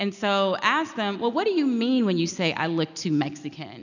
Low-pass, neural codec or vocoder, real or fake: 7.2 kHz; none; real